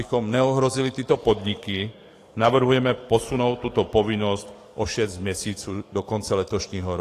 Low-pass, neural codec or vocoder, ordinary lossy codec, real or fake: 14.4 kHz; codec, 44.1 kHz, 7.8 kbps, DAC; AAC, 48 kbps; fake